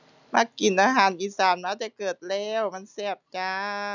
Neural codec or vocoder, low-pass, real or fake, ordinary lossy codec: none; 7.2 kHz; real; none